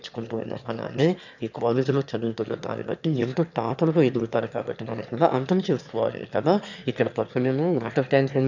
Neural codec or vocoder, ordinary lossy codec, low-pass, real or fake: autoencoder, 22.05 kHz, a latent of 192 numbers a frame, VITS, trained on one speaker; none; 7.2 kHz; fake